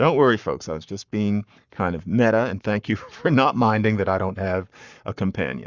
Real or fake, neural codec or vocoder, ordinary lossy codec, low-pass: fake; codec, 44.1 kHz, 7.8 kbps, Pupu-Codec; Opus, 64 kbps; 7.2 kHz